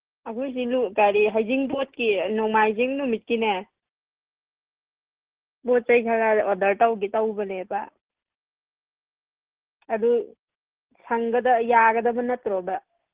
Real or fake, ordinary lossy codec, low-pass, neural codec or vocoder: real; Opus, 16 kbps; 3.6 kHz; none